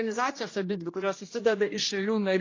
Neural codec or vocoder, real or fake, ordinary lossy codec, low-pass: codec, 16 kHz, 1 kbps, X-Codec, HuBERT features, trained on general audio; fake; AAC, 32 kbps; 7.2 kHz